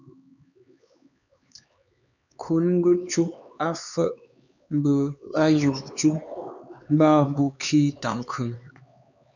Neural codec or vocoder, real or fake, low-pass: codec, 16 kHz, 4 kbps, X-Codec, HuBERT features, trained on LibriSpeech; fake; 7.2 kHz